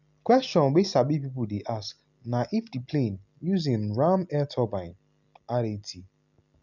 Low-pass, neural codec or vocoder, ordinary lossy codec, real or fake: 7.2 kHz; none; none; real